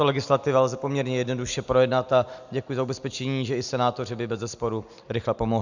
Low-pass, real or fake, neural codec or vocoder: 7.2 kHz; real; none